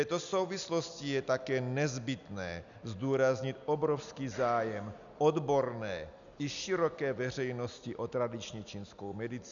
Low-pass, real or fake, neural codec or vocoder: 7.2 kHz; real; none